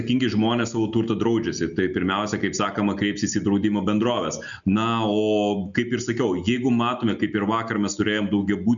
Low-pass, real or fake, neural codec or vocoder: 7.2 kHz; real; none